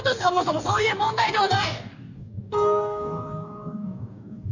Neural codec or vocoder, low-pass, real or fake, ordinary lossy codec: codec, 44.1 kHz, 2.6 kbps, DAC; 7.2 kHz; fake; none